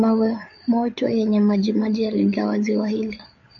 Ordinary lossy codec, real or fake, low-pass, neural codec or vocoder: AAC, 64 kbps; fake; 7.2 kHz; codec, 16 kHz, 8 kbps, FreqCodec, larger model